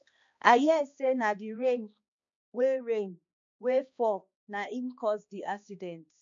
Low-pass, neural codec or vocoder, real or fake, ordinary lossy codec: 7.2 kHz; codec, 16 kHz, 2 kbps, X-Codec, HuBERT features, trained on balanced general audio; fake; MP3, 48 kbps